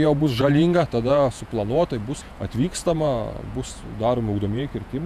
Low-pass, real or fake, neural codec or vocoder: 14.4 kHz; fake; vocoder, 48 kHz, 128 mel bands, Vocos